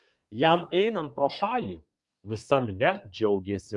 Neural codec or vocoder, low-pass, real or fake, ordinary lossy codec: codec, 24 kHz, 1 kbps, SNAC; 10.8 kHz; fake; MP3, 96 kbps